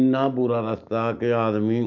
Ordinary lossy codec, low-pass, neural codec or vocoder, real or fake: none; 7.2 kHz; none; real